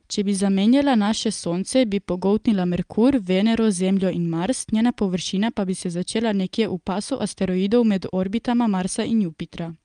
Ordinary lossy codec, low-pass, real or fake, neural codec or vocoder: Opus, 32 kbps; 9.9 kHz; real; none